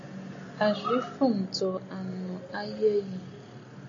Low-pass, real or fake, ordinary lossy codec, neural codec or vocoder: 7.2 kHz; real; AAC, 32 kbps; none